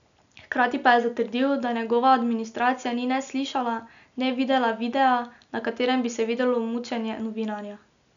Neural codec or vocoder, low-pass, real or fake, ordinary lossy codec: none; 7.2 kHz; real; none